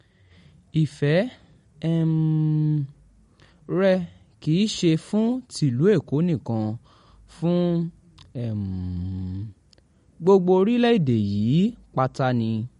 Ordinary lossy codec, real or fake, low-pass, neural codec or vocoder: MP3, 48 kbps; real; 19.8 kHz; none